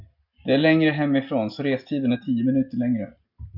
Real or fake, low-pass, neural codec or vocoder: real; 5.4 kHz; none